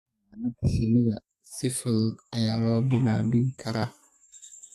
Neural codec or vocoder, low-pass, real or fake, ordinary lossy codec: codec, 32 kHz, 1.9 kbps, SNAC; 14.4 kHz; fake; AAC, 64 kbps